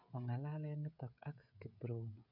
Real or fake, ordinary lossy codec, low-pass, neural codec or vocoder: fake; none; 5.4 kHz; codec, 16 kHz, 16 kbps, FreqCodec, smaller model